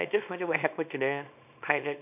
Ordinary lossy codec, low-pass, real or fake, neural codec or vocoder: none; 3.6 kHz; fake; codec, 24 kHz, 0.9 kbps, WavTokenizer, small release